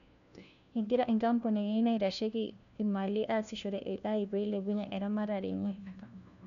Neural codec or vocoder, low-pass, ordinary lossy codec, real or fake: codec, 16 kHz, 1 kbps, FunCodec, trained on LibriTTS, 50 frames a second; 7.2 kHz; none; fake